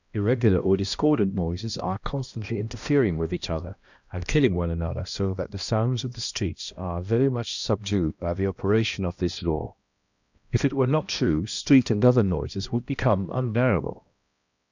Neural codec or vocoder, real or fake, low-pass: codec, 16 kHz, 1 kbps, X-Codec, HuBERT features, trained on balanced general audio; fake; 7.2 kHz